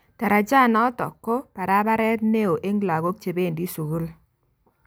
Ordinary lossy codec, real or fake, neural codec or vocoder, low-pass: none; real; none; none